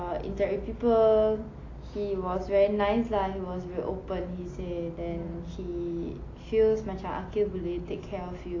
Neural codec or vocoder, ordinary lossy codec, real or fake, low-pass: none; AAC, 32 kbps; real; 7.2 kHz